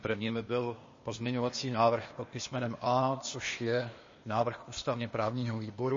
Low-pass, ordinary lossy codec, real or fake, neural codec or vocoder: 7.2 kHz; MP3, 32 kbps; fake; codec, 16 kHz, 0.8 kbps, ZipCodec